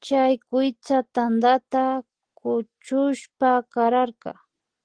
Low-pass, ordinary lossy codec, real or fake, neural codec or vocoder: 9.9 kHz; Opus, 16 kbps; real; none